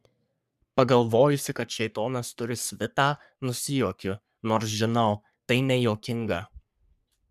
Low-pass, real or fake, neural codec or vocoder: 14.4 kHz; fake; codec, 44.1 kHz, 3.4 kbps, Pupu-Codec